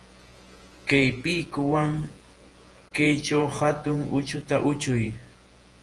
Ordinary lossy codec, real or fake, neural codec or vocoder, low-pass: Opus, 24 kbps; fake; vocoder, 48 kHz, 128 mel bands, Vocos; 10.8 kHz